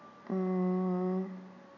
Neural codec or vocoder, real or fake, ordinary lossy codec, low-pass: none; real; none; 7.2 kHz